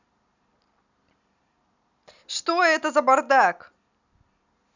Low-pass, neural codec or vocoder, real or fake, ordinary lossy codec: 7.2 kHz; none; real; none